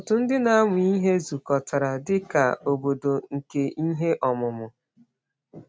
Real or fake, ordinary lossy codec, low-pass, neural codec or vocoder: real; none; none; none